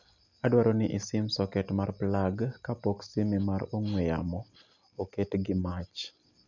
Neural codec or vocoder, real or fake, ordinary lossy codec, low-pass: none; real; none; 7.2 kHz